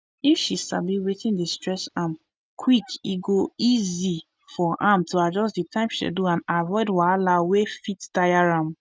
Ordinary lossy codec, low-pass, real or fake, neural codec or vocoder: none; none; real; none